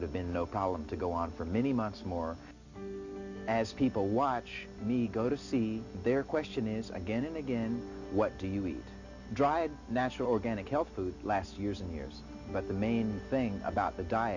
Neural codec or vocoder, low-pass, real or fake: none; 7.2 kHz; real